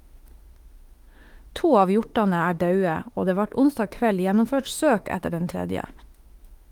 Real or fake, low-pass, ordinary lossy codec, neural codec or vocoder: fake; 19.8 kHz; Opus, 24 kbps; autoencoder, 48 kHz, 32 numbers a frame, DAC-VAE, trained on Japanese speech